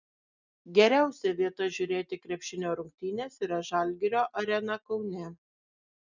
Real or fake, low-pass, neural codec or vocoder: real; 7.2 kHz; none